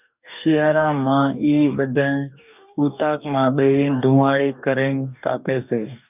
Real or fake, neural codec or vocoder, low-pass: fake; codec, 44.1 kHz, 2.6 kbps, DAC; 3.6 kHz